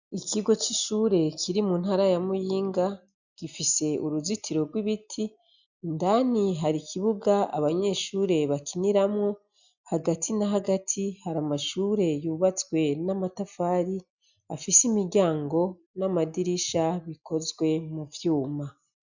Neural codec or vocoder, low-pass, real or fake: none; 7.2 kHz; real